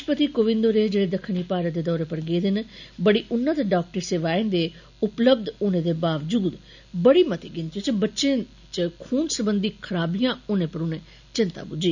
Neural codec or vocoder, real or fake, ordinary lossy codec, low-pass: none; real; none; 7.2 kHz